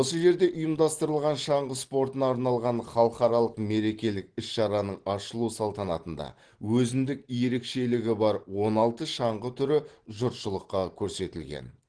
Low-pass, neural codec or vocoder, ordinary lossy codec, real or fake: 9.9 kHz; none; Opus, 16 kbps; real